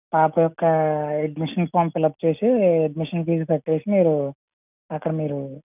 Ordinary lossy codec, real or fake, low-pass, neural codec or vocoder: none; real; 3.6 kHz; none